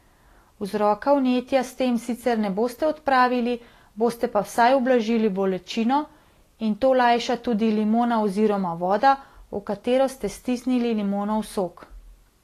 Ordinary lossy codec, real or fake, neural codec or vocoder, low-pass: AAC, 48 kbps; real; none; 14.4 kHz